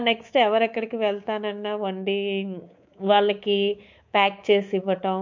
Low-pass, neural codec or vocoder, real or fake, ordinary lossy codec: 7.2 kHz; codec, 24 kHz, 3.1 kbps, DualCodec; fake; MP3, 48 kbps